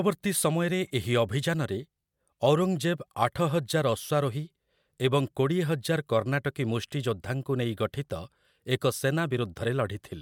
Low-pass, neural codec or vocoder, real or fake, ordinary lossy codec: 19.8 kHz; none; real; MP3, 96 kbps